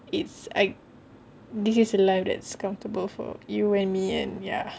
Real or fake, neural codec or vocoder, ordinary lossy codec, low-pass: real; none; none; none